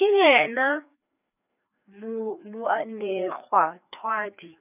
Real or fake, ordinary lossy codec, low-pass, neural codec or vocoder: fake; none; 3.6 kHz; codec, 16 kHz, 2 kbps, FreqCodec, larger model